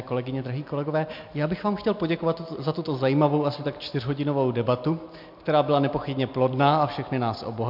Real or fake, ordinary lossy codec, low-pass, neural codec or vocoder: real; MP3, 48 kbps; 5.4 kHz; none